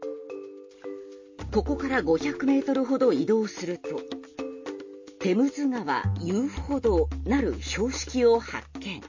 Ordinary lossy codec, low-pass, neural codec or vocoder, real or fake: MP3, 32 kbps; 7.2 kHz; none; real